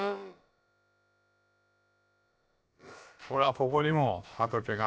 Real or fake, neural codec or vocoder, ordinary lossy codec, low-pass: fake; codec, 16 kHz, about 1 kbps, DyCAST, with the encoder's durations; none; none